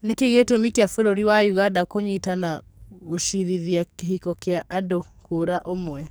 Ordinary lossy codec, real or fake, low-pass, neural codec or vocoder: none; fake; none; codec, 44.1 kHz, 2.6 kbps, SNAC